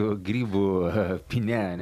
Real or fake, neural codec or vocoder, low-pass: real; none; 14.4 kHz